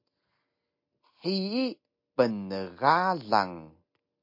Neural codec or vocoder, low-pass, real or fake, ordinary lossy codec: none; 5.4 kHz; real; MP3, 32 kbps